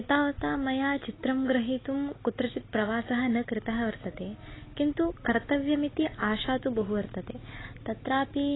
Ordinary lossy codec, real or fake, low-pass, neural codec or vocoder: AAC, 16 kbps; real; 7.2 kHz; none